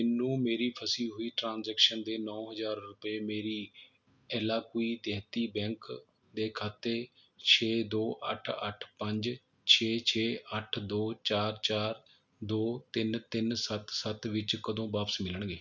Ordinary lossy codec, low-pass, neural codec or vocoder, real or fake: none; 7.2 kHz; none; real